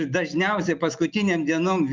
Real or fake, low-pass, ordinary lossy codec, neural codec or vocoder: real; 7.2 kHz; Opus, 24 kbps; none